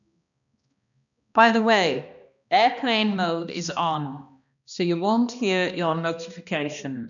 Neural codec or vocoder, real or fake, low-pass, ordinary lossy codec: codec, 16 kHz, 1 kbps, X-Codec, HuBERT features, trained on balanced general audio; fake; 7.2 kHz; none